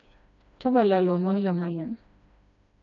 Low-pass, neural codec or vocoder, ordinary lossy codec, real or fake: 7.2 kHz; codec, 16 kHz, 1 kbps, FreqCodec, smaller model; none; fake